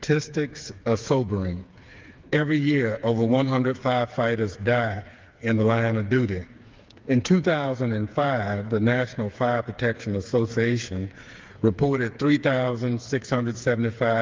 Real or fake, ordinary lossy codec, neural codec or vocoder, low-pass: fake; Opus, 24 kbps; codec, 16 kHz, 4 kbps, FreqCodec, smaller model; 7.2 kHz